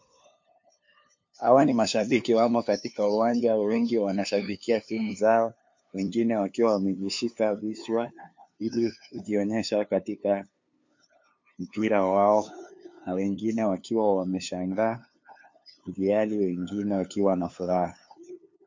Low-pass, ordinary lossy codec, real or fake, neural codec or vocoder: 7.2 kHz; MP3, 48 kbps; fake; codec, 16 kHz, 2 kbps, FunCodec, trained on LibriTTS, 25 frames a second